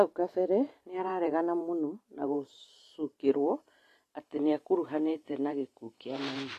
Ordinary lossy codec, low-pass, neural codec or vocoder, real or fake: AAC, 48 kbps; 14.4 kHz; vocoder, 44.1 kHz, 128 mel bands every 512 samples, BigVGAN v2; fake